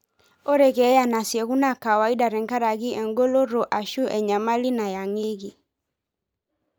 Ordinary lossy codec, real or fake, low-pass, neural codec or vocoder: none; real; none; none